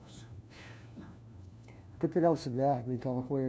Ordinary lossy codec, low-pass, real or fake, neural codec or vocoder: none; none; fake; codec, 16 kHz, 1 kbps, FunCodec, trained on LibriTTS, 50 frames a second